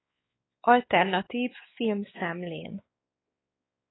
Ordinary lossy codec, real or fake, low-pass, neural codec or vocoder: AAC, 16 kbps; fake; 7.2 kHz; codec, 16 kHz, 4 kbps, X-Codec, WavLM features, trained on Multilingual LibriSpeech